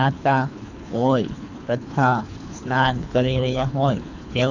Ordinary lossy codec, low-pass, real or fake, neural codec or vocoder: none; 7.2 kHz; fake; codec, 24 kHz, 3 kbps, HILCodec